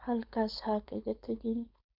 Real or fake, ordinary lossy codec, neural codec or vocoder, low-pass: fake; Opus, 64 kbps; codec, 16 kHz, 4.8 kbps, FACodec; 5.4 kHz